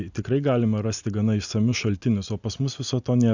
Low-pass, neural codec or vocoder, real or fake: 7.2 kHz; none; real